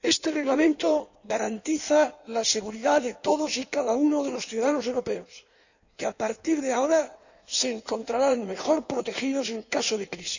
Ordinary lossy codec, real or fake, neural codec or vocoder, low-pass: none; fake; codec, 16 kHz in and 24 kHz out, 1.1 kbps, FireRedTTS-2 codec; 7.2 kHz